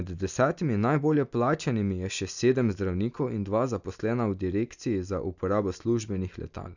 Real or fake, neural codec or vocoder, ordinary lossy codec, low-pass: real; none; none; 7.2 kHz